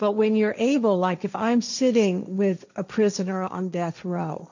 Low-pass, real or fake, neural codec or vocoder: 7.2 kHz; fake; codec, 16 kHz, 1.1 kbps, Voila-Tokenizer